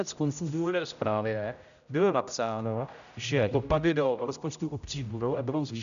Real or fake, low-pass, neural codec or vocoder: fake; 7.2 kHz; codec, 16 kHz, 0.5 kbps, X-Codec, HuBERT features, trained on general audio